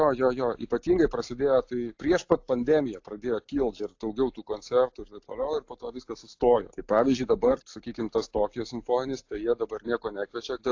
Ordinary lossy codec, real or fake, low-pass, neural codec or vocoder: AAC, 48 kbps; real; 7.2 kHz; none